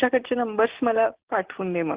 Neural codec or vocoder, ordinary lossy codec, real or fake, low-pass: none; Opus, 16 kbps; real; 3.6 kHz